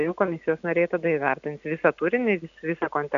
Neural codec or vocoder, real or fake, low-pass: none; real; 7.2 kHz